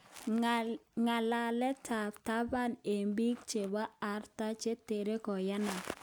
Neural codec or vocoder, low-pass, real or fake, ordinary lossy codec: none; none; real; none